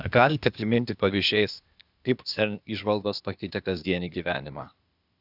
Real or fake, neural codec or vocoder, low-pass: fake; codec, 16 kHz, 0.8 kbps, ZipCodec; 5.4 kHz